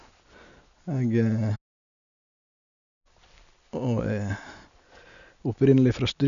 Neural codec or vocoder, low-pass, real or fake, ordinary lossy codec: none; 7.2 kHz; real; none